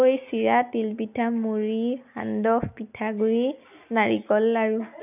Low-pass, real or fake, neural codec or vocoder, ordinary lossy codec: 3.6 kHz; real; none; none